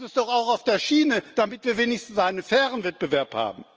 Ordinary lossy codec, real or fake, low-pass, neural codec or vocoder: Opus, 24 kbps; real; 7.2 kHz; none